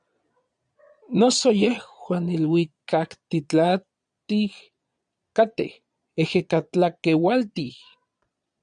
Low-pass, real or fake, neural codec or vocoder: 9.9 kHz; fake; vocoder, 22.05 kHz, 80 mel bands, Vocos